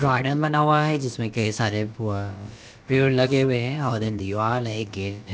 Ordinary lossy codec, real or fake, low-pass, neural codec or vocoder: none; fake; none; codec, 16 kHz, about 1 kbps, DyCAST, with the encoder's durations